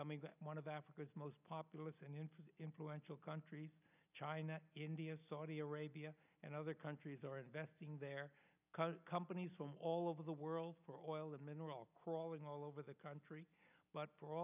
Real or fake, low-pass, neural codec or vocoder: real; 3.6 kHz; none